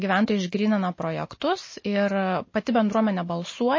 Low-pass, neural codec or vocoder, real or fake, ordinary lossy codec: 7.2 kHz; none; real; MP3, 32 kbps